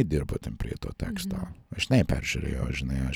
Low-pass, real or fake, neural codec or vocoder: 19.8 kHz; real; none